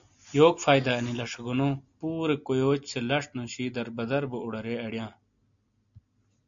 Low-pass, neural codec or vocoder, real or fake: 7.2 kHz; none; real